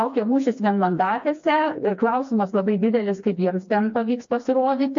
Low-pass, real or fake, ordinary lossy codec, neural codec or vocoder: 7.2 kHz; fake; MP3, 48 kbps; codec, 16 kHz, 2 kbps, FreqCodec, smaller model